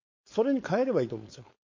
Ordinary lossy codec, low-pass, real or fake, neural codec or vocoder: MP3, 48 kbps; 7.2 kHz; fake; codec, 16 kHz, 4.8 kbps, FACodec